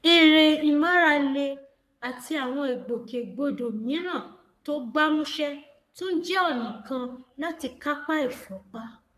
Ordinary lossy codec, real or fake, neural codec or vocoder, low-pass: none; fake; codec, 44.1 kHz, 3.4 kbps, Pupu-Codec; 14.4 kHz